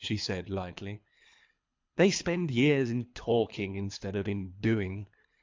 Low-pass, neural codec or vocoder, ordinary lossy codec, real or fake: 7.2 kHz; codec, 24 kHz, 3 kbps, HILCodec; MP3, 64 kbps; fake